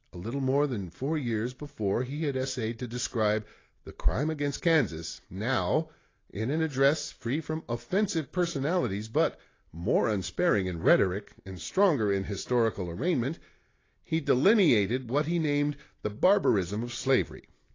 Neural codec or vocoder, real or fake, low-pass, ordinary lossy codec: none; real; 7.2 kHz; AAC, 32 kbps